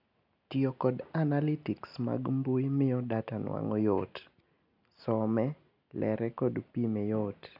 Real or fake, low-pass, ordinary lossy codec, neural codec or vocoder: real; 5.4 kHz; none; none